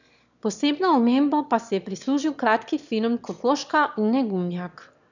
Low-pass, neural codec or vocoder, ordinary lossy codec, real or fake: 7.2 kHz; autoencoder, 22.05 kHz, a latent of 192 numbers a frame, VITS, trained on one speaker; none; fake